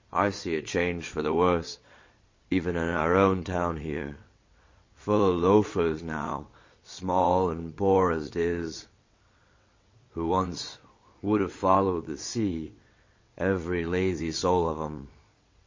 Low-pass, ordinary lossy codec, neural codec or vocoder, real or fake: 7.2 kHz; MP3, 32 kbps; vocoder, 22.05 kHz, 80 mel bands, WaveNeXt; fake